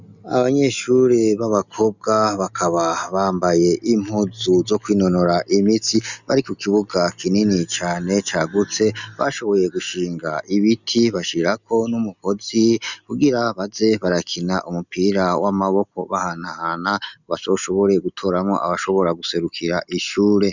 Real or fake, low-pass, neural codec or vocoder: real; 7.2 kHz; none